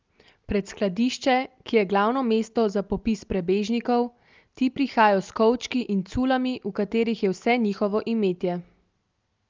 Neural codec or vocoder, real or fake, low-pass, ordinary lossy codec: none; real; 7.2 kHz; Opus, 32 kbps